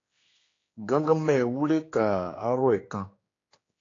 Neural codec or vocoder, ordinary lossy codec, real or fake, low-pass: codec, 16 kHz, 2 kbps, X-Codec, HuBERT features, trained on general audio; AAC, 32 kbps; fake; 7.2 kHz